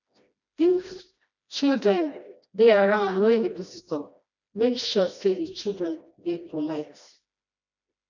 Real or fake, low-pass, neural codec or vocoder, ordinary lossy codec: fake; 7.2 kHz; codec, 16 kHz, 1 kbps, FreqCodec, smaller model; none